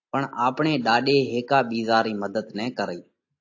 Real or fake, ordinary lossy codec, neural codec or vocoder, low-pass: real; AAC, 48 kbps; none; 7.2 kHz